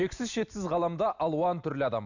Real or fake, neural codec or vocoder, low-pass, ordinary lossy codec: real; none; 7.2 kHz; none